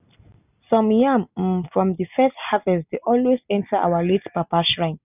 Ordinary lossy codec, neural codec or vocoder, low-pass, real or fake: none; none; 3.6 kHz; real